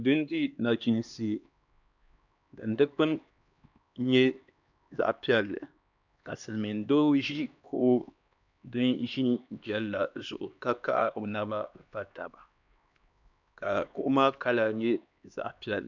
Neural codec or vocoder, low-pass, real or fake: codec, 16 kHz, 2 kbps, X-Codec, HuBERT features, trained on LibriSpeech; 7.2 kHz; fake